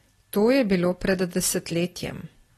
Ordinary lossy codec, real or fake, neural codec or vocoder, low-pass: AAC, 32 kbps; real; none; 19.8 kHz